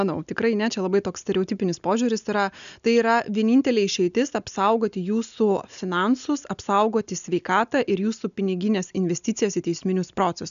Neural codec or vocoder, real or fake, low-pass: none; real; 7.2 kHz